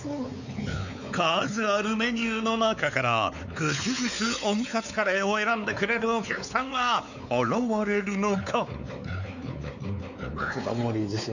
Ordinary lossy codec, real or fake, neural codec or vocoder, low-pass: none; fake; codec, 16 kHz, 4 kbps, X-Codec, WavLM features, trained on Multilingual LibriSpeech; 7.2 kHz